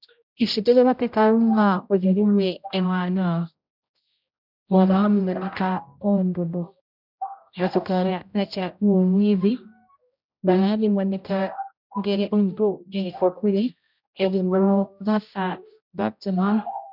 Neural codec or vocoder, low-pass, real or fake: codec, 16 kHz, 0.5 kbps, X-Codec, HuBERT features, trained on general audio; 5.4 kHz; fake